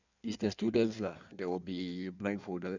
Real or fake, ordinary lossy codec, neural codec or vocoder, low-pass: fake; none; codec, 16 kHz in and 24 kHz out, 1.1 kbps, FireRedTTS-2 codec; 7.2 kHz